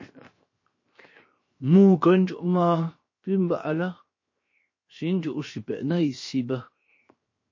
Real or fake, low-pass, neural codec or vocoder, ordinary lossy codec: fake; 7.2 kHz; codec, 16 kHz, 0.7 kbps, FocalCodec; MP3, 32 kbps